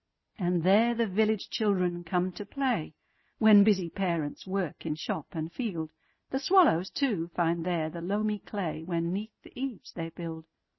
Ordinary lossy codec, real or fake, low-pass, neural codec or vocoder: MP3, 24 kbps; real; 7.2 kHz; none